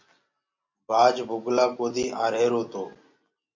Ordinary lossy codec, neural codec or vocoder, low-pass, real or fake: MP3, 48 kbps; none; 7.2 kHz; real